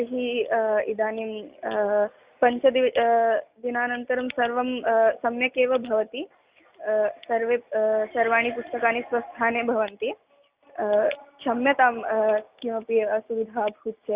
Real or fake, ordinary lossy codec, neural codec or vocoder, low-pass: real; Opus, 64 kbps; none; 3.6 kHz